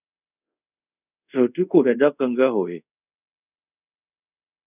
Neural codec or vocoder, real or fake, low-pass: codec, 24 kHz, 0.5 kbps, DualCodec; fake; 3.6 kHz